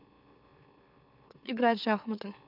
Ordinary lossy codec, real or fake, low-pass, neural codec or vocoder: none; fake; 5.4 kHz; autoencoder, 44.1 kHz, a latent of 192 numbers a frame, MeloTTS